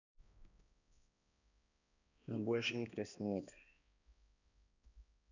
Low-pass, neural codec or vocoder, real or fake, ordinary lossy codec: 7.2 kHz; codec, 16 kHz, 1 kbps, X-Codec, HuBERT features, trained on balanced general audio; fake; none